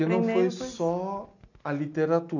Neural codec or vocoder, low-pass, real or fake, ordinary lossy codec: none; 7.2 kHz; real; none